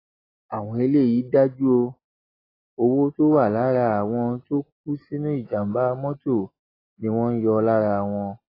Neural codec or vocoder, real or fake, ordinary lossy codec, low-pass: none; real; AAC, 24 kbps; 5.4 kHz